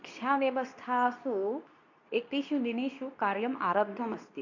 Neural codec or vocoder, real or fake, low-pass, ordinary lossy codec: codec, 24 kHz, 0.9 kbps, WavTokenizer, medium speech release version 2; fake; 7.2 kHz; none